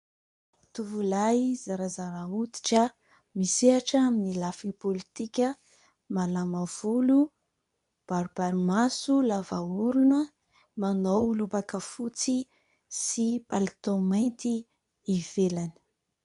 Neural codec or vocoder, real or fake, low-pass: codec, 24 kHz, 0.9 kbps, WavTokenizer, medium speech release version 2; fake; 10.8 kHz